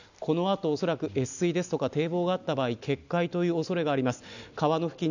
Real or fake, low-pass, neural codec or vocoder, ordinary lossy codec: real; 7.2 kHz; none; none